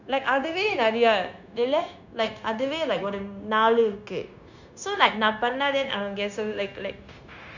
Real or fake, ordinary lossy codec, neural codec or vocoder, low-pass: fake; none; codec, 16 kHz, 0.9 kbps, LongCat-Audio-Codec; 7.2 kHz